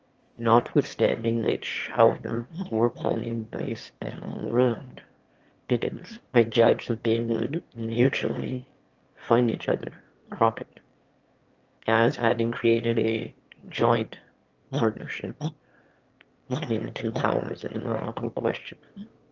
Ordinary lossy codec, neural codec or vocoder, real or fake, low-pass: Opus, 24 kbps; autoencoder, 22.05 kHz, a latent of 192 numbers a frame, VITS, trained on one speaker; fake; 7.2 kHz